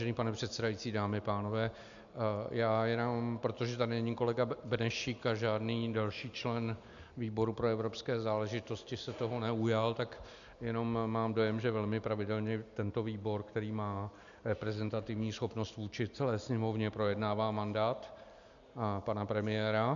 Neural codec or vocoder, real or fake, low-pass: none; real; 7.2 kHz